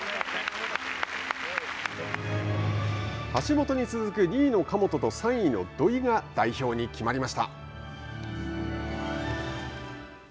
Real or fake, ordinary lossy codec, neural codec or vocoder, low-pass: real; none; none; none